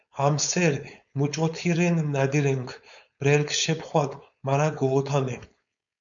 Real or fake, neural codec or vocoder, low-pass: fake; codec, 16 kHz, 4.8 kbps, FACodec; 7.2 kHz